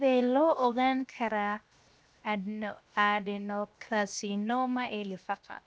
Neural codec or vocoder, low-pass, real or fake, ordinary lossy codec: codec, 16 kHz, 0.7 kbps, FocalCodec; none; fake; none